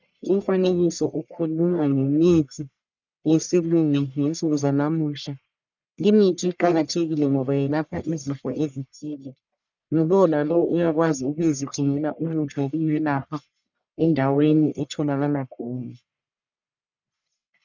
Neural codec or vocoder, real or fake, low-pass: codec, 44.1 kHz, 1.7 kbps, Pupu-Codec; fake; 7.2 kHz